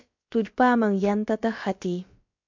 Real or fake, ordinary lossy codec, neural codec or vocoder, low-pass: fake; MP3, 48 kbps; codec, 16 kHz, about 1 kbps, DyCAST, with the encoder's durations; 7.2 kHz